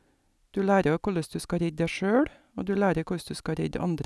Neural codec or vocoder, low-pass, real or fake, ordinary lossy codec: none; none; real; none